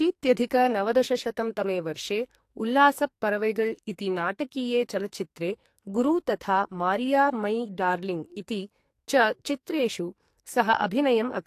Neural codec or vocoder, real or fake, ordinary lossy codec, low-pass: codec, 44.1 kHz, 2.6 kbps, SNAC; fake; AAC, 64 kbps; 14.4 kHz